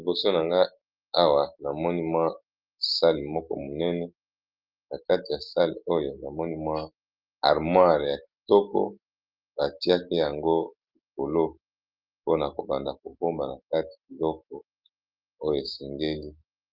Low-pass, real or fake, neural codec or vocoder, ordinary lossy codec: 5.4 kHz; real; none; Opus, 24 kbps